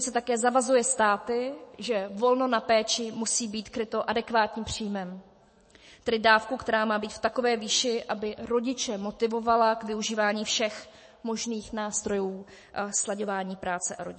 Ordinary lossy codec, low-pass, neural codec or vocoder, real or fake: MP3, 32 kbps; 10.8 kHz; autoencoder, 48 kHz, 128 numbers a frame, DAC-VAE, trained on Japanese speech; fake